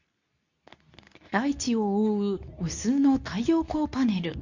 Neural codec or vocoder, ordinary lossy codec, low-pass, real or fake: codec, 24 kHz, 0.9 kbps, WavTokenizer, medium speech release version 2; none; 7.2 kHz; fake